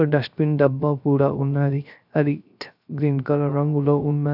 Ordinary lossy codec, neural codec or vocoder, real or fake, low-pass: none; codec, 16 kHz, 0.3 kbps, FocalCodec; fake; 5.4 kHz